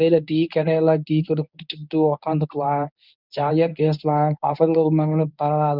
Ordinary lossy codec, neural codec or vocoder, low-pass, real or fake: none; codec, 24 kHz, 0.9 kbps, WavTokenizer, medium speech release version 1; 5.4 kHz; fake